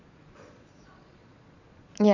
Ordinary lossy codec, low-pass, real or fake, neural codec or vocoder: none; 7.2 kHz; fake; vocoder, 22.05 kHz, 80 mel bands, Vocos